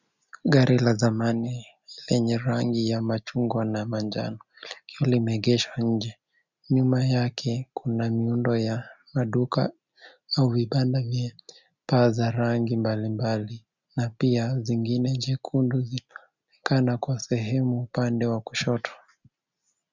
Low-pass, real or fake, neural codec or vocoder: 7.2 kHz; real; none